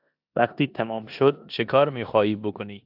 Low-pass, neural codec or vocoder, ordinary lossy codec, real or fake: 5.4 kHz; codec, 16 kHz in and 24 kHz out, 0.9 kbps, LongCat-Audio-Codec, four codebook decoder; AAC, 48 kbps; fake